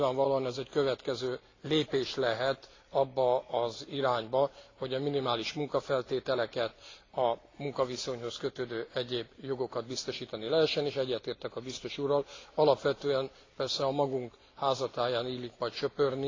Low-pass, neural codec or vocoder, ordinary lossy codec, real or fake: 7.2 kHz; none; AAC, 32 kbps; real